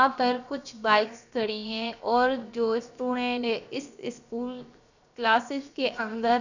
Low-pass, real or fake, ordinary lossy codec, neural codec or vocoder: 7.2 kHz; fake; none; codec, 16 kHz, 0.7 kbps, FocalCodec